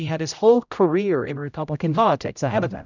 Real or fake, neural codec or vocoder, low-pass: fake; codec, 16 kHz, 0.5 kbps, X-Codec, HuBERT features, trained on general audio; 7.2 kHz